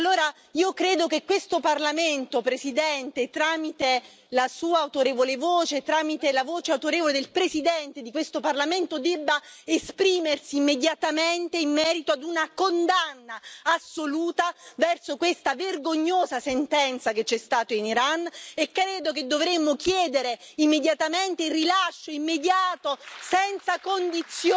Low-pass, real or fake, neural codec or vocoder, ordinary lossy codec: none; real; none; none